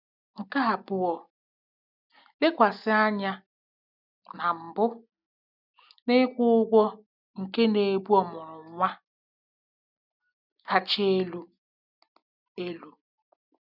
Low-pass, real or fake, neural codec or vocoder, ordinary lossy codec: 5.4 kHz; real; none; none